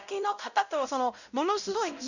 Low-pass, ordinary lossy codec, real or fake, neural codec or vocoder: 7.2 kHz; none; fake; codec, 16 kHz, 0.5 kbps, X-Codec, WavLM features, trained on Multilingual LibriSpeech